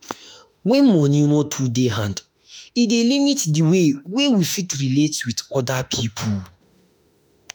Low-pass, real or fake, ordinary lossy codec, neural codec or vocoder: none; fake; none; autoencoder, 48 kHz, 32 numbers a frame, DAC-VAE, trained on Japanese speech